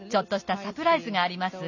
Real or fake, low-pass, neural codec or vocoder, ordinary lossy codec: real; 7.2 kHz; none; none